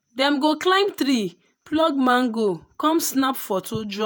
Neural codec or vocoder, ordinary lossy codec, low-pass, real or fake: vocoder, 48 kHz, 128 mel bands, Vocos; none; none; fake